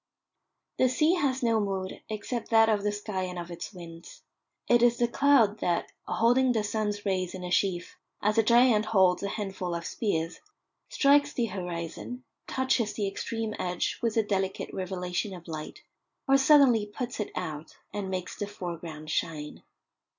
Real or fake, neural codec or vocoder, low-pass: real; none; 7.2 kHz